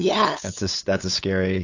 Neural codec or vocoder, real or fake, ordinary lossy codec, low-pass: codec, 16 kHz, 8 kbps, FunCodec, trained on LibriTTS, 25 frames a second; fake; AAC, 48 kbps; 7.2 kHz